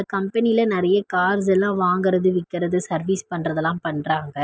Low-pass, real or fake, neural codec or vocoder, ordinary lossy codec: none; real; none; none